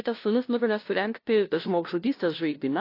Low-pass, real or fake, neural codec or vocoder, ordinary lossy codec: 5.4 kHz; fake; codec, 16 kHz, 0.5 kbps, FunCodec, trained on LibriTTS, 25 frames a second; AAC, 32 kbps